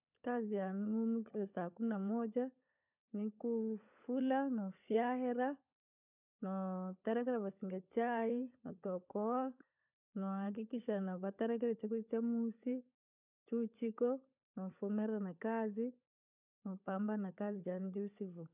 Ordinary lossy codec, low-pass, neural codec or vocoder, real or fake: none; 3.6 kHz; codec, 16 kHz, 16 kbps, FunCodec, trained on LibriTTS, 50 frames a second; fake